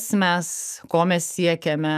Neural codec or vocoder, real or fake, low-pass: autoencoder, 48 kHz, 128 numbers a frame, DAC-VAE, trained on Japanese speech; fake; 14.4 kHz